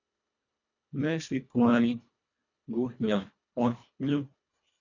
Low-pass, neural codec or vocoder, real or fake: 7.2 kHz; codec, 24 kHz, 1.5 kbps, HILCodec; fake